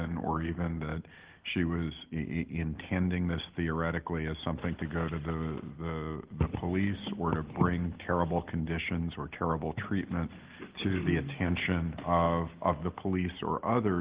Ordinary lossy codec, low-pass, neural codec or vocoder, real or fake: Opus, 16 kbps; 3.6 kHz; none; real